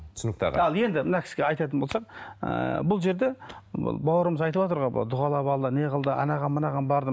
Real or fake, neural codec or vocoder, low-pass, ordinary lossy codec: real; none; none; none